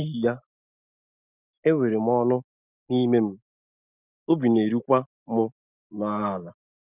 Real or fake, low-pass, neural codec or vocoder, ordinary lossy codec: real; 3.6 kHz; none; Opus, 64 kbps